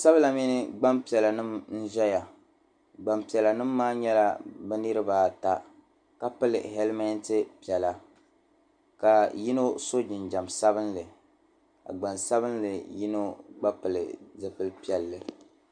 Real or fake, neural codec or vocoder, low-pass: real; none; 9.9 kHz